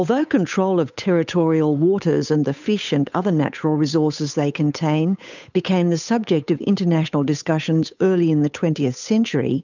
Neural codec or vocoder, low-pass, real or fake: codec, 16 kHz, 8 kbps, FunCodec, trained on Chinese and English, 25 frames a second; 7.2 kHz; fake